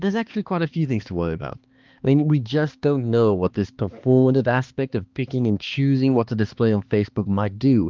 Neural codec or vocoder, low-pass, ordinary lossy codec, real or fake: codec, 16 kHz, 2 kbps, X-Codec, HuBERT features, trained on balanced general audio; 7.2 kHz; Opus, 32 kbps; fake